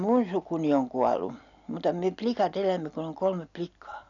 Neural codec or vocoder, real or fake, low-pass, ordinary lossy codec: none; real; 7.2 kHz; none